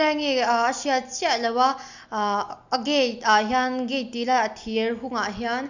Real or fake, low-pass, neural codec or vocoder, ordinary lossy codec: real; 7.2 kHz; none; none